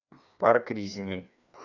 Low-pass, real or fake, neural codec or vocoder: 7.2 kHz; fake; codec, 16 kHz, 2 kbps, FreqCodec, larger model